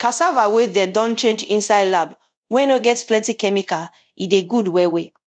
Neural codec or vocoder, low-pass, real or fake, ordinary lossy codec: codec, 24 kHz, 0.5 kbps, DualCodec; 9.9 kHz; fake; none